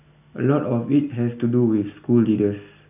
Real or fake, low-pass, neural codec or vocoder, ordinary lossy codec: real; 3.6 kHz; none; none